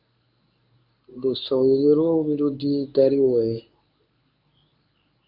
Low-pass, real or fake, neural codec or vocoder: 5.4 kHz; fake; codec, 24 kHz, 0.9 kbps, WavTokenizer, medium speech release version 1